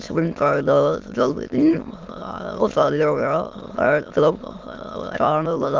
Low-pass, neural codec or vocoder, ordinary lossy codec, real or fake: 7.2 kHz; autoencoder, 22.05 kHz, a latent of 192 numbers a frame, VITS, trained on many speakers; Opus, 24 kbps; fake